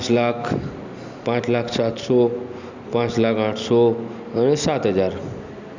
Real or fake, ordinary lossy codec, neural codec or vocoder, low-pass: real; none; none; 7.2 kHz